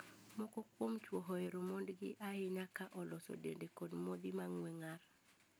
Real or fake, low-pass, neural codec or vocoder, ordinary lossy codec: real; none; none; none